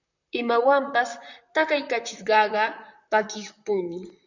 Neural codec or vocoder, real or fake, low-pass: vocoder, 44.1 kHz, 128 mel bands, Pupu-Vocoder; fake; 7.2 kHz